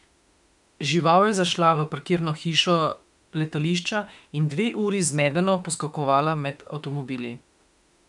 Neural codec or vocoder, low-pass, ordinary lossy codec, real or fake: autoencoder, 48 kHz, 32 numbers a frame, DAC-VAE, trained on Japanese speech; 10.8 kHz; none; fake